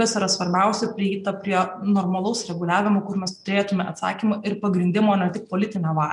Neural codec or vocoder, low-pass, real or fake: none; 10.8 kHz; real